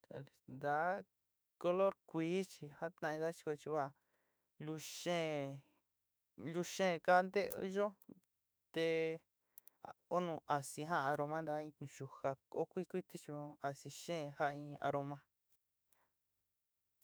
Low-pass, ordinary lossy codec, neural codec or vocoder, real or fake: none; none; autoencoder, 48 kHz, 32 numbers a frame, DAC-VAE, trained on Japanese speech; fake